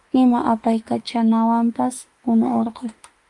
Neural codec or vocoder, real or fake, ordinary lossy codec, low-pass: autoencoder, 48 kHz, 32 numbers a frame, DAC-VAE, trained on Japanese speech; fake; Opus, 32 kbps; 10.8 kHz